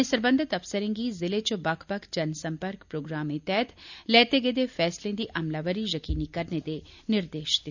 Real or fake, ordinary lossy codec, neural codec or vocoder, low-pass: real; none; none; 7.2 kHz